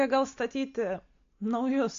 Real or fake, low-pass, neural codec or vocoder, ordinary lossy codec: real; 7.2 kHz; none; MP3, 48 kbps